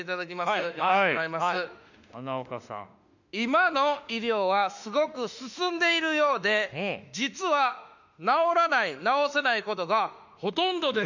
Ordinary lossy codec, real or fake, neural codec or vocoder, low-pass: none; fake; autoencoder, 48 kHz, 32 numbers a frame, DAC-VAE, trained on Japanese speech; 7.2 kHz